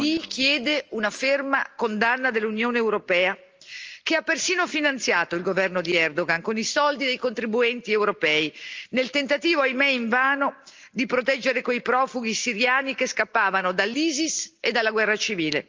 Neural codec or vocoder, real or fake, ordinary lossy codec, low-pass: none; real; Opus, 24 kbps; 7.2 kHz